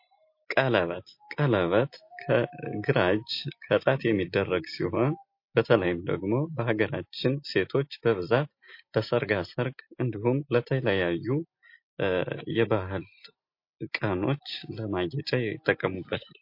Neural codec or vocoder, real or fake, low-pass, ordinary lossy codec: none; real; 5.4 kHz; MP3, 32 kbps